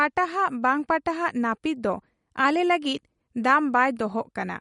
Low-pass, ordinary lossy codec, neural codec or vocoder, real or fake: 10.8 kHz; MP3, 48 kbps; none; real